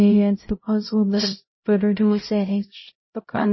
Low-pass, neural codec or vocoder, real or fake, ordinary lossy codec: 7.2 kHz; codec, 16 kHz, 0.5 kbps, X-Codec, HuBERT features, trained on LibriSpeech; fake; MP3, 24 kbps